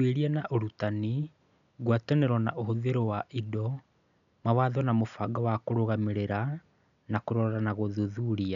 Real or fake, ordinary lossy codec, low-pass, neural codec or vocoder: real; none; 7.2 kHz; none